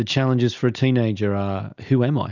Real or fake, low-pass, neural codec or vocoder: real; 7.2 kHz; none